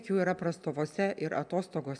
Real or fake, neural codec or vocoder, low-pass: real; none; 9.9 kHz